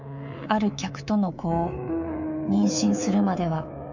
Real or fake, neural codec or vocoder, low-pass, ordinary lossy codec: fake; codec, 24 kHz, 3.1 kbps, DualCodec; 7.2 kHz; none